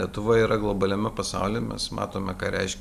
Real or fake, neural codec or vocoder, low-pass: real; none; 14.4 kHz